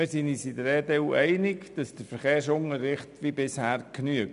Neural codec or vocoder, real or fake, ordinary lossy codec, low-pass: none; real; MP3, 96 kbps; 10.8 kHz